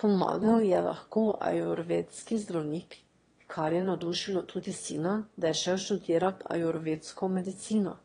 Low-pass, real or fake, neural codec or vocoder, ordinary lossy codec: 9.9 kHz; fake; autoencoder, 22.05 kHz, a latent of 192 numbers a frame, VITS, trained on one speaker; AAC, 32 kbps